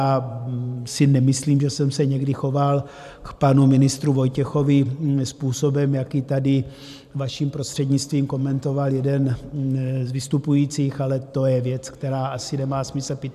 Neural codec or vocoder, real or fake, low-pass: vocoder, 44.1 kHz, 128 mel bands every 512 samples, BigVGAN v2; fake; 14.4 kHz